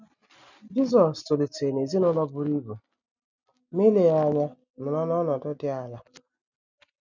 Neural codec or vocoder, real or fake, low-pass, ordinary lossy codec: none; real; 7.2 kHz; none